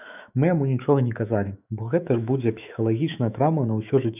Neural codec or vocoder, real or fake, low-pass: autoencoder, 48 kHz, 128 numbers a frame, DAC-VAE, trained on Japanese speech; fake; 3.6 kHz